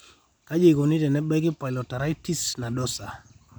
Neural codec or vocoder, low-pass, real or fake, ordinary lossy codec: none; none; real; none